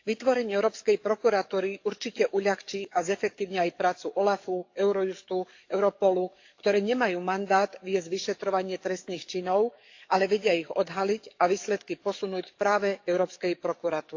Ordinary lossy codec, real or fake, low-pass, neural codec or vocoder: AAC, 48 kbps; fake; 7.2 kHz; codec, 44.1 kHz, 7.8 kbps, DAC